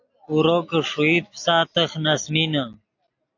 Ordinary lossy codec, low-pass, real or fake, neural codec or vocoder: AAC, 48 kbps; 7.2 kHz; real; none